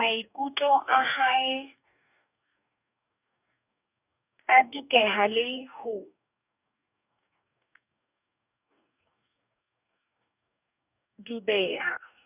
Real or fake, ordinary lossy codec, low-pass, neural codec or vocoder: fake; none; 3.6 kHz; codec, 44.1 kHz, 2.6 kbps, DAC